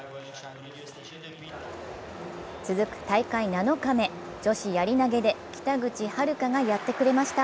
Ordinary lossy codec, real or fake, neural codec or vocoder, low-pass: none; real; none; none